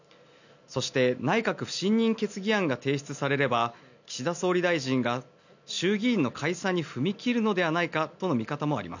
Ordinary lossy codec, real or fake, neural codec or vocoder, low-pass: none; real; none; 7.2 kHz